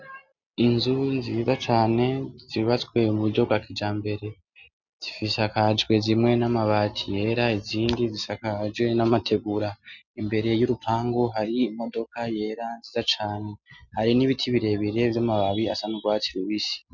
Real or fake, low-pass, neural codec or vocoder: real; 7.2 kHz; none